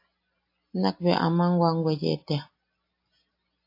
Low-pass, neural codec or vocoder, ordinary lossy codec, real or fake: 5.4 kHz; none; AAC, 32 kbps; real